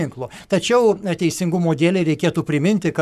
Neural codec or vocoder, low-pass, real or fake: codec, 44.1 kHz, 7.8 kbps, Pupu-Codec; 14.4 kHz; fake